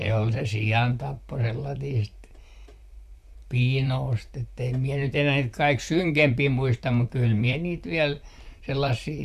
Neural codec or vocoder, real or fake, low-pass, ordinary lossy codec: vocoder, 44.1 kHz, 128 mel bands, Pupu-Vocoder; fake; 14.4 kHz; MP3, 96 kbps